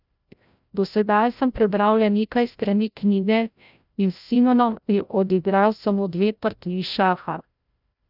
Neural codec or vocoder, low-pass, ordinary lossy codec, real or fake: codec, 16 kHz, 0.5 kbps, FreqCodec, larger model; 5.4 kHz; none; fake